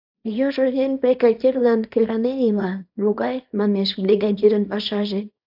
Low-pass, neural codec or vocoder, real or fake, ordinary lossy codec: 5.4 kHz; codec, 24 kHz, 0.9 kbps, WavTokenizer, small release; fake; AAC, 48 kbps